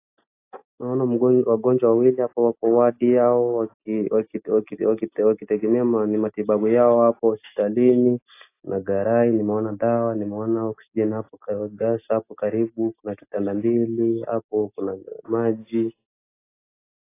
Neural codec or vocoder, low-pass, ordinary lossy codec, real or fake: none; 3.6 kHz; AAC, 24 kbps; real